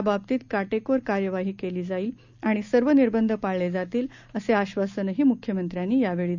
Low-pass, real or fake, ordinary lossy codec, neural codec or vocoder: 7.2 kHz; real; none; none